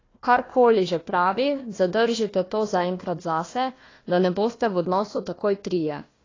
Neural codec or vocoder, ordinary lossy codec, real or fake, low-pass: codec, 16 kHz, 1 kbps, FunCodec, trained on Chinese and English, 50 frames a second; AAC, 32 kbps; fake; 7.2 kHz